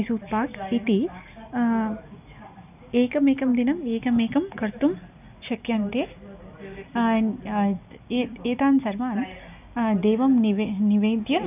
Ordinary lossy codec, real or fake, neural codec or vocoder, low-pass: none; real; none; 3.6 kHz